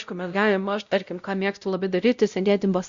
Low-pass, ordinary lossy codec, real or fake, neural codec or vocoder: 7.2 kHz; Opus, 64 kbps; fake; codec, 16 kHz, 0.5 kbps, X-Codec, WavLM features, trained on Multilingual LibriSpeech